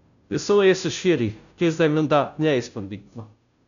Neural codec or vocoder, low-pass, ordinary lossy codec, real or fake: codec, 16 kHz, 0.5 kbps, FunCodec, trained on Chinese and English, 25 frames a second; 7.2 kHz; none; fake